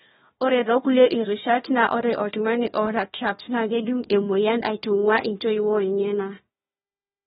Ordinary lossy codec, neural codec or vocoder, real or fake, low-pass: AAC, 16 kbps; codec, 16 kHz, 1 kbps, FunCodec, trained on Chinese and English, 50 frames a second; fake; 7.2 kHz